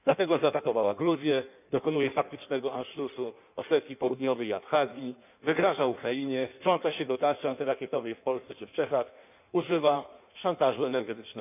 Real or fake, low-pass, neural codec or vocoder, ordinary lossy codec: fake; 3.6 kHz; codec, 16 kHz in and 24 kHz out, 1.1 kbps, FireRedTTS-2 codec; none